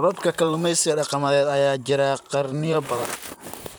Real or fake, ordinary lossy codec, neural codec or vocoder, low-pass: fake; none; vocoder, 44.1 kHz, 128 mel bands, Pupu-Vocoder; none